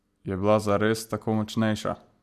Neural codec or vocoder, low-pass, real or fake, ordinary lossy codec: none; 14.4 kHz; real; none